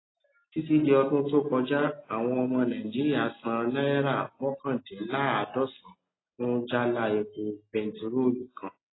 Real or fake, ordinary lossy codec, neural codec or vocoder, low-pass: real; AAC, 16 kbps; none; 7.2 kHz